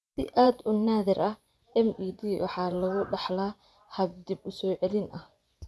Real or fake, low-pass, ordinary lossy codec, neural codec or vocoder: fake; none; none; vocoder, 24 kHz, 100 mel bands, Vocos